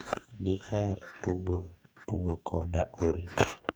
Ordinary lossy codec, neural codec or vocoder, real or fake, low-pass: none; codec, 44.1 kHz, 2.6 kbps, DAC; fake; none